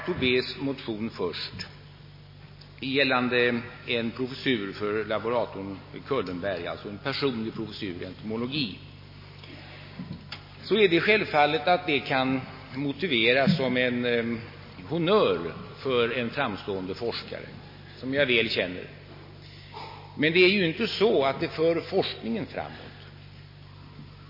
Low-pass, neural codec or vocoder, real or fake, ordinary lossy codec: 5.4 kHz; none; real; MP3, 24 kbps